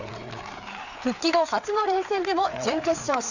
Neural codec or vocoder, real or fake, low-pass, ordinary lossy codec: codec, 16 kHz, 4 kbps, FreqCodec, larger model; fake; 7.2 kHz; none